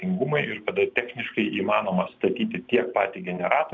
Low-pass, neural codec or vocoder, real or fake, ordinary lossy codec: 7.2 kHz; none; real; MP3, 64 kbps